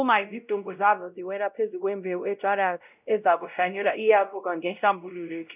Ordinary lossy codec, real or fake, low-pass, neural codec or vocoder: none; fake; 3.6 kHz; codec, 16 kHz, 0.5 kbps, X-Codec, WavLM features, trained on Multilingual LibriSpeech